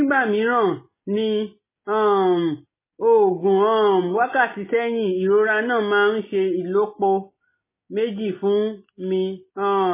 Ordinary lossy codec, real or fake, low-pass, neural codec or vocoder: MP3, 16 kbps; real; 3.6 kHz; none